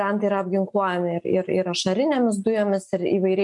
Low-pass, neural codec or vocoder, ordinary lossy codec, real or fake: 10.8 kHz; none; MP3, 64 kbps; real